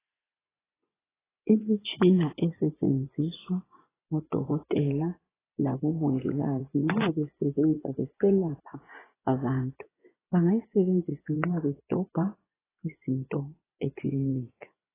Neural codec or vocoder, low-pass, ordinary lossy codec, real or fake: vocoder, 24 kHz, 100 mel bands, Vocos; 3.6 kHz; AAC, 16 kbps; fake